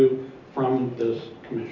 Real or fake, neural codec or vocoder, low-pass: real; none; 7.2 kHz